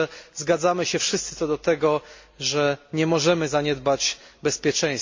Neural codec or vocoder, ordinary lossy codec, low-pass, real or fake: none; MP3, 48 kbps; 7.2 kHz; real